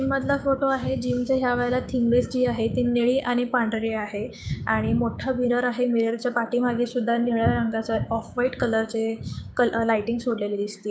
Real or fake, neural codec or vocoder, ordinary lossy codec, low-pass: fake; codec, 16 kHz, 6 kbps, DAC; none; none